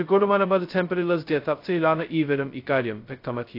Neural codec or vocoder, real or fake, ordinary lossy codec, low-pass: codec, 16 kHz, 0.2 kbps, FocalCodec; fake; AAC, 32 kbps; 5.4 kHz